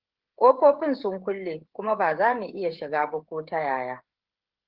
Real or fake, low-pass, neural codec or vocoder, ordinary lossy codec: fake; 5.4 kHz; codec, 16 kHz, 16 kbps, FreqCodec, smaller model; Opus, 16 kbps